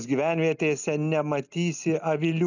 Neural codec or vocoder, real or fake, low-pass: none; real; 7.2 kHz